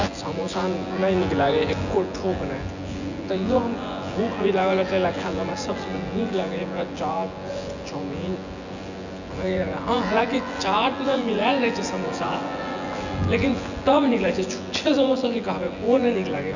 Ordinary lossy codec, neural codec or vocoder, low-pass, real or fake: none; vocoder, 24 kHz, 100 mel bands, Vocos; 7.2 kHz; fake